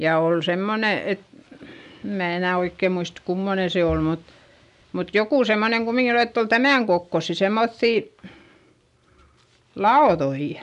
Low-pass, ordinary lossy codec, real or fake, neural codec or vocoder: 10.8 kHz; none; real; none